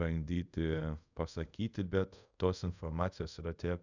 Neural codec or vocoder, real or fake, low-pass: codec, 24 kHz, 0.5 kbps, DualCodec; fake; 7.2 kHz